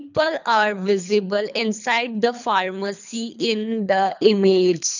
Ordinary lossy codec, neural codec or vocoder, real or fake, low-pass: none; codec, 24 kHz, 3 kbps, HILCodec; fake; 7.2 kHz